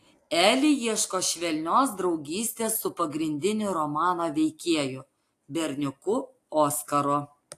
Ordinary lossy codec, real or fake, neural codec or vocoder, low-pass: AAC, 64 kbps; real; none; 14.4 kHz